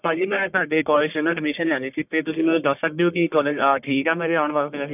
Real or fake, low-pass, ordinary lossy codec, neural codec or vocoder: fake; 3.6 kHz; none; codec, 44.1 kHz, 1.7 kbps, Pupu-Codec